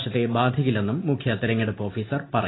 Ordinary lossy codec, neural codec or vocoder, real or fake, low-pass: AAC, 16 kbps; none; real; 7.2 kHz